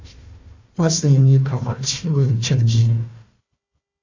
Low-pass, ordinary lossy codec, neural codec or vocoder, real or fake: 7.2 kHz; AAC, 48 kbps; codec, 16 kHz, 1 kbps, FunCodec, trained on Chinese and English, 50 frames a second; fake